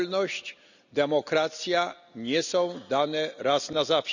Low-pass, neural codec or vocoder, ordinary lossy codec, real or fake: 7.2 kHz; none; none; real